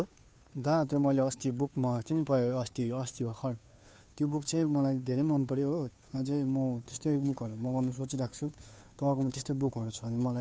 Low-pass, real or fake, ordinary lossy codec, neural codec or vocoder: none; fake; none; codec, 16 kHz, 2 kbps, FunCodec, trained on Chinese and English, 25 frames a second